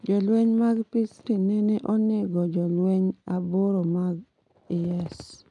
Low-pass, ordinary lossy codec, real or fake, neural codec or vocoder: 10.8 kHz; none; real; none